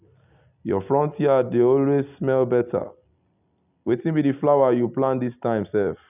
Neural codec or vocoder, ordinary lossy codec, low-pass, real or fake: none; none; 3.6 kHz; real